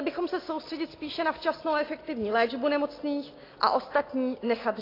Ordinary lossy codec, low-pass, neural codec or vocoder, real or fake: AAC, 24 kbps; 5.4 kHz; none; real